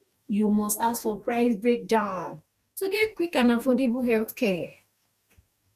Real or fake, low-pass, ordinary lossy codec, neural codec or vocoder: fake; 14.4 kHz; none; codec, 44.1 kHz, 2.6 kbps, DAC